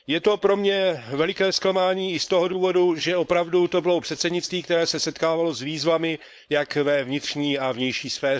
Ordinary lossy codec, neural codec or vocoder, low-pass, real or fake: none; codec, 16 kHz, 4.8 kbps, FACodec; none; fake